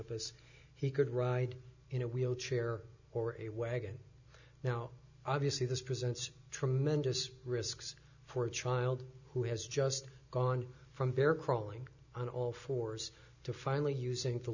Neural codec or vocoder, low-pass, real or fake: none; 7.2 kHz; real